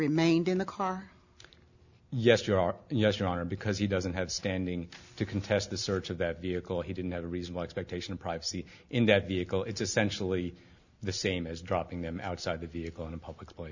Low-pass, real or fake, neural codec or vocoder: 7.2 kHz; real; none